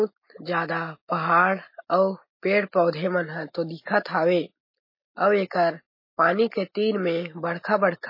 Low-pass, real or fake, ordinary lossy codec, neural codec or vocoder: 5.4 kHz; real; MP3, 24 kbps; none